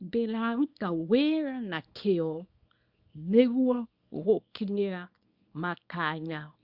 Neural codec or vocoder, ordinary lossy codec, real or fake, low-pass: codec, 24 kHz, 0.9 kbps, WavTokenizer, small release; Opus, 64 kbps; fake; 5.4 kHz